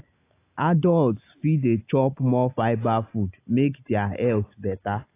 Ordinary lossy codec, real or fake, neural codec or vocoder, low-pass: AAC, 24 kbps; real; none; 3.6 kHz